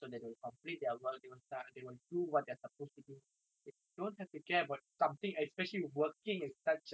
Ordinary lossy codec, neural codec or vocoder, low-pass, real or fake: none; none; none; real